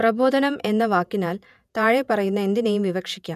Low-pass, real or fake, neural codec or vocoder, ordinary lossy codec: 14.4 kHz; fake; vocoder, 48 kHz, 128 mel bands, Vocos; none